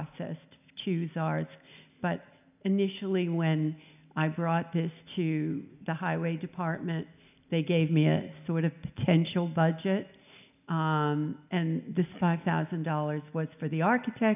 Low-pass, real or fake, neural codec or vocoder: 3.6 kHz; real; none